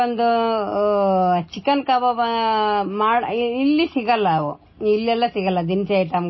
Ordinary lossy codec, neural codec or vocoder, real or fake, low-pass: MP3, 24 kbps; none; real; 7.2 kHz